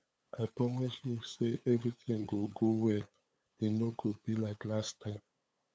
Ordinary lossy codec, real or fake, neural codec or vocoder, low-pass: none; fake; codec, 16 kHz, 8 kbps, FunCodec, trained on LibriTTS, 25 frames a second; none